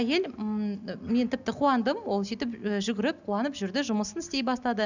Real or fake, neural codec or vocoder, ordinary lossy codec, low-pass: real; none; none; 7.2 kHz